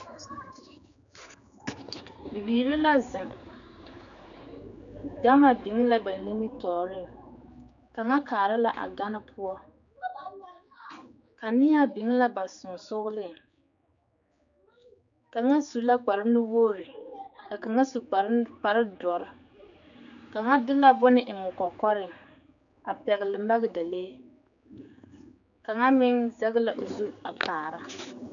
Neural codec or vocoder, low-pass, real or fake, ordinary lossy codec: codec, 16 kHz, 4 kbps, X-Codec, HuBERT features, trained on general audio; 7.2 kHz; fake; MP3, 96 kbps